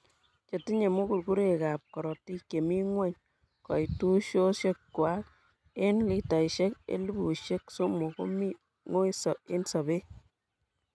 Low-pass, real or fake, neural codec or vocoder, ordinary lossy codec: none; real; none; none